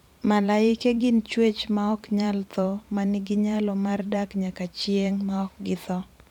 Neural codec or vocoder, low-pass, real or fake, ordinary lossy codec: vocoder, 44.1 kHz, 128 mel bands every 512 samples, BigVGAN v2; 19.8 kHz; fake; none